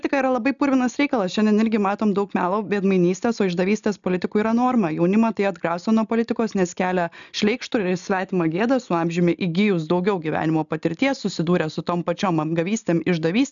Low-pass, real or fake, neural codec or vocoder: 7.2 kHz; real; none